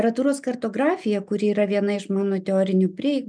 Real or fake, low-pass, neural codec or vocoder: real; 9.9 kHz; none